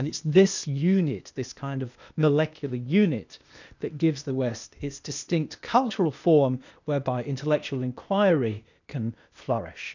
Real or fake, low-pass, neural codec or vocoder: fake; 7.2 kHz; codec, 16 kHz, 0.8 kbps, ZipCodec